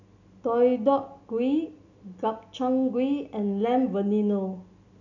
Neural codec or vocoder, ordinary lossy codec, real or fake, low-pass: none; none; real; 7.2 kHz